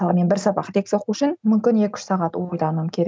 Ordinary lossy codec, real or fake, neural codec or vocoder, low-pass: none; real; none; none